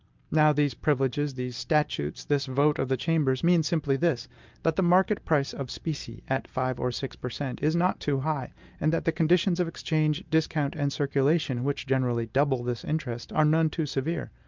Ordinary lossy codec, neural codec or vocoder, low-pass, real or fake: Opus, 24 kbps; none; 7.2 kHz; real